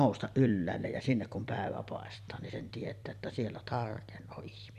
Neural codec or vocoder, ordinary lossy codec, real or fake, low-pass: vocoder, 44.1 kHz, 128 mel bands every 256 samples, BigVGAN v2; none; fake; 14.4 kHz